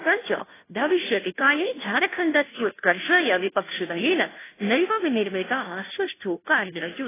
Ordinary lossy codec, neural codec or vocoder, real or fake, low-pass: AAC, 16 kbps; codec, 16 kHz, 0.5 kbps, FunCodec, trained on Chinese and English, 25 frames a second; fake; 3.6 kHz